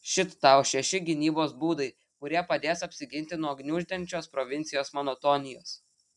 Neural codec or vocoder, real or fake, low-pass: none; real; 10.8 kHz